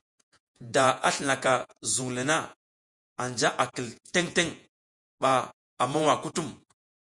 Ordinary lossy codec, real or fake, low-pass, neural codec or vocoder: MP3, 64 kbps; fake; 10.8 kHz; vocoder, 48 kHz, 128 mel bands, Vocos